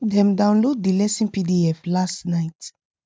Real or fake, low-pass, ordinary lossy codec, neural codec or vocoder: real; none; none; none